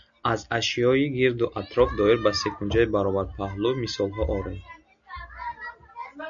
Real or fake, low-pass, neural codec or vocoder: real; 7.2 kHz; none